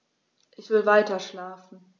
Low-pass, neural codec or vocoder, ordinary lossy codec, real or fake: none; none; none; real